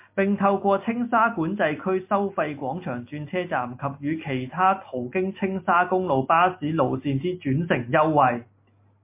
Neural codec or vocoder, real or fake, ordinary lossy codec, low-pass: none; real; MP3, 24 kbps; 3.6 kHz